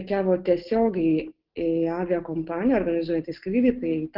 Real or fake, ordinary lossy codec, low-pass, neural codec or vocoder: real; Opus, 16 kbps; 5.4 kHz; none